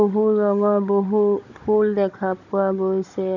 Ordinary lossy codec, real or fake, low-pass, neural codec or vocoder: none; fake; 7.2 kHz; codec, 16 kHz, 16 kbps, FunCodec, trained on Chinese and English, 50 frames a second